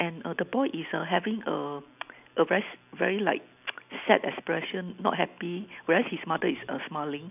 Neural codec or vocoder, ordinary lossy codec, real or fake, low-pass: none; none; real; 3.6 kHz